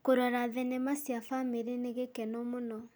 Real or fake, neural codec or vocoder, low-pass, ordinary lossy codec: real; none; none; none